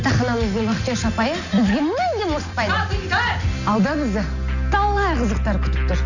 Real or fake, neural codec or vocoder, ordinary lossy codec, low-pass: real; none; none; 7.2 kHz